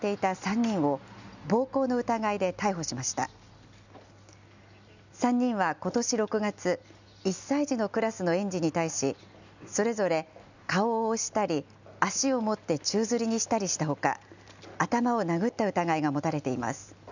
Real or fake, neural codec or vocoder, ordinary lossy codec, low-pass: real; none; none; 7.2 kHz